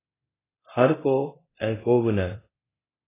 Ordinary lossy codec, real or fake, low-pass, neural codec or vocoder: AAC, 16 kbps; fake; 3.6 kHz; codec, 24 kHz, 0.9 kbps, DualCodec